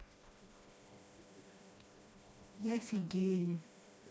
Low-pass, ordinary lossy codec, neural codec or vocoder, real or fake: none; none; codec, 16 kHz, 1 kbps, FreqCodec, smaller model; fake